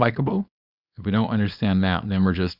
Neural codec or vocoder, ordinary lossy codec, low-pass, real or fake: codec, 24 kHz, 0.9 kbps, WavTokenizer, small release; Opus, 64 kbps; 5.4 kHz; fake